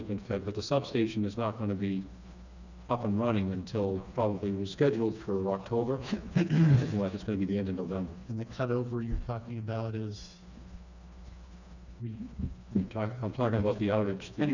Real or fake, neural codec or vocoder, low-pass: fake; codec, 16 kHz, 2 kbps, FreqCodec, smaller model; 7.2 kHz